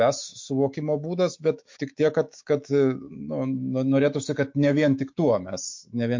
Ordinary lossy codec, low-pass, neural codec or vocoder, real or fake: MP3, 48 kbps; 7.2 kHz; autoencoder, 48 kHz, 128 numbers a frame, DAC-VAE, trained on Japanese speech; fake